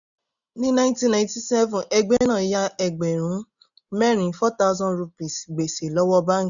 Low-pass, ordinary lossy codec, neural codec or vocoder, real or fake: 7.2 kHz; none; none; real